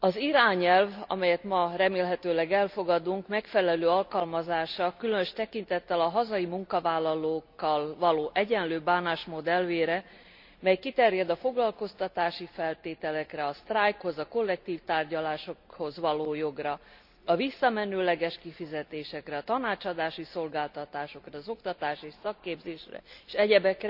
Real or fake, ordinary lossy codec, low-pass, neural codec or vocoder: real; none; 5.4 kHz; none